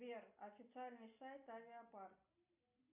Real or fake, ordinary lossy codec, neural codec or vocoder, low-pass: real; MP3, 32 kbps; none; 3.6 kHz